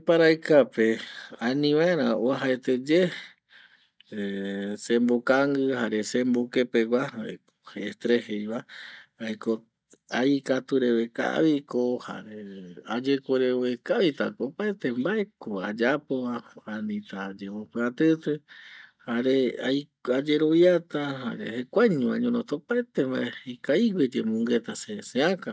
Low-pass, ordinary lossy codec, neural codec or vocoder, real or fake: none; none; none; real